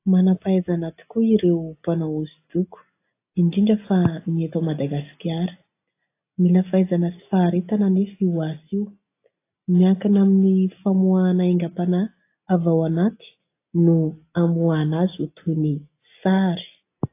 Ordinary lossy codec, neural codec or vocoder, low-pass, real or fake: AAC, 24 kbps; none; 3.6 kHz; real